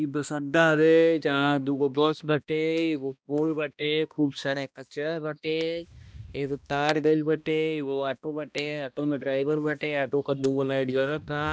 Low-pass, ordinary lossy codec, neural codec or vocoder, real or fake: none; none; codec, 16 kHz, 1 kbps, X-Codec, HuBERT features, trained on balanced general audio; fake